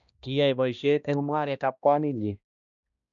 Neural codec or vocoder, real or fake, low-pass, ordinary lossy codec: codec, 16 kHz, 1 kbps, X-Codec, HuBERT features, trained on balanced general audio; fake; 7.2 kHz; none